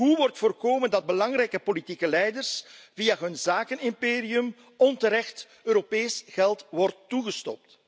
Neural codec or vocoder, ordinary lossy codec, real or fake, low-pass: none; none; real; none